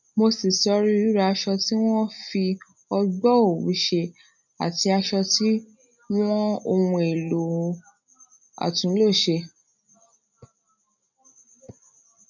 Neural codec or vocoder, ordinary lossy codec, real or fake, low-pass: none; none; real; 7.2 kHz